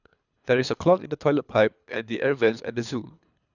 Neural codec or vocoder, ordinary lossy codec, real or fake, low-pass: codec, 24 kHz, 3 kbps, HILCodec; none; fake; 7.2 kHz